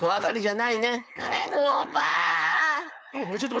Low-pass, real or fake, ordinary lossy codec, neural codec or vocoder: none; fake; none; codec, 16 kHz, 4.8 kbps, FACodec